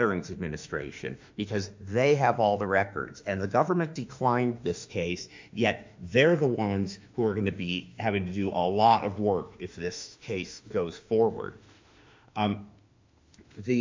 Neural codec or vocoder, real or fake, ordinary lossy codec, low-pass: autoencoder, 48 kHz, 32 numbers a frame, DAC-VAE, trained on Japanese speech; fake; MP3, 64 kbps; 7.2 kHz